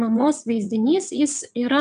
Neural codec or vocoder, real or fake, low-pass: vocoder, 22.05 kHz, 80 mel bands, WaveNeXt; fake; 9.9 kHz